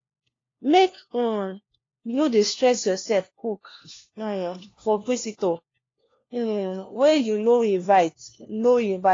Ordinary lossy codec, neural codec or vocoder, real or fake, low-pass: AAC, 32 kbps; codec, 16 kHz, 1 kbps, FunCodec, trained on LibriTTS, 50 frames a second; fake; 7.2 kHz